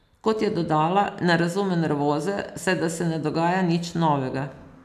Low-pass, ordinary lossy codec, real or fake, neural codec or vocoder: 14.4 kHz; none; real; none